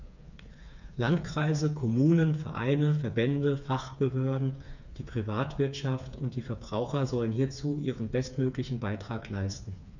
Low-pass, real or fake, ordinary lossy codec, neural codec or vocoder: 7.2 kHz; fake; none; codec, 16 kHz, 4 kbps, FreqCodec, smaller model